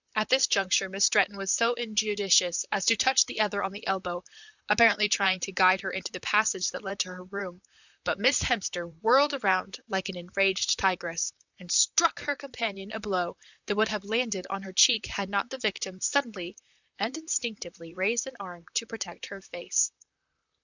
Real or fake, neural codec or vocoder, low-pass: fake; vocoder, 44.1 kHz, 128 mel bands, Pupu-Vocoder; 7.2 kHz